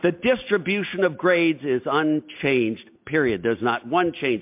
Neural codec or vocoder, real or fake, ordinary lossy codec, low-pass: none; real; MP3, 32 kbps; 3.6 kHz